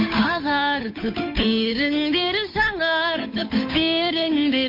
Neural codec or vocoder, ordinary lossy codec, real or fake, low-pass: codec, 16 kHz, 8 kbps, FunCodec, trained on Chinese and English, 25 frames a second; MP3, 32 kbps; fake; 5.4 kHz